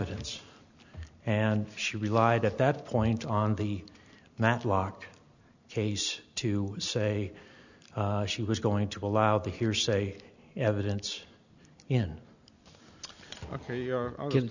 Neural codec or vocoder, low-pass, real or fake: none; 7.2 kHz; real